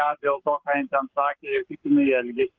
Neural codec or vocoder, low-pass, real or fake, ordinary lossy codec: codec, 44.1 kHz, 7.8 kbps, Pupu-Codec; 7.2 kHz; fake; Opus, 24 kbps